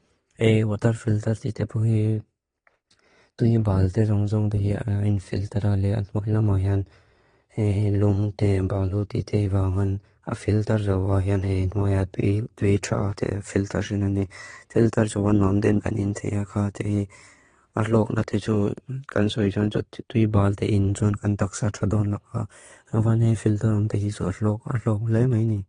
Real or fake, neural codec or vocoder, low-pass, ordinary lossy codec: fake; vocoder, 22.05 kHz, 80 mel bands, Vocos; 9.9 kHz; AAC, 32 kbps